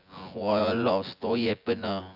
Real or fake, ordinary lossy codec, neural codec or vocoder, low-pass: fake; AAC, 48 kbps; vocoder, 24 kHz, 100 mel bands, Vocos; 5.4 kHz